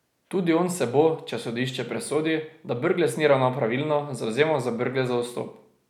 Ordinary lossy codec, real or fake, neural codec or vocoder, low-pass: none; real; none; 19.8 kHz